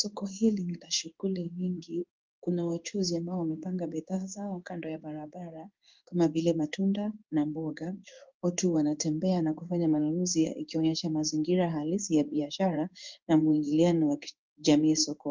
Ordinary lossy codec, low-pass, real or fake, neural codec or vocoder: Opus, 24 kbps; 7.2 kHz; fake; codec, 16 kHz in and 24 kHz out, 1 kbps, XY-Tokenizer